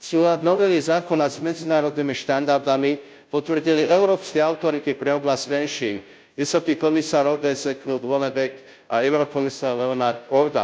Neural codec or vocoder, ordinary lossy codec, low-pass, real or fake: codec, 16 kHz, 0.5 kbps, FunCodec, trained on Chinese and English, 25 frames a second; none; none; fake